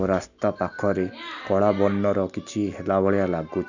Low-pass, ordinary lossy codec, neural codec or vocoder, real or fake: 7.2 kHz; none; none; real